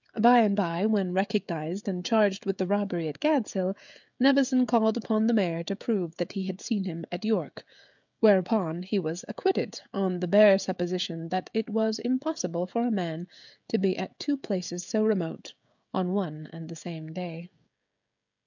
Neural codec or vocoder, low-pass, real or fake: codec, 16 kHz, 16 kbps, FreqCodec, smaller model; 7.2 kHz; fake